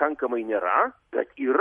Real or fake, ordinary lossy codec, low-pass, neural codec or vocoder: real; MP3, 48 kbps; 19.8 kHz; none